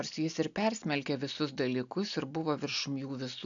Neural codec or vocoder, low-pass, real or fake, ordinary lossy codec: none; 7.2 kHz; real; AAC, 64 kbps